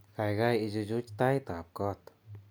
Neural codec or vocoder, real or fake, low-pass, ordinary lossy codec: none; real; none; none